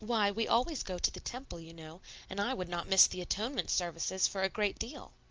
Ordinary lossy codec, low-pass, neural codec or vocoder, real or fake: Opus, 24 kbps; 7.2 kHz; none; real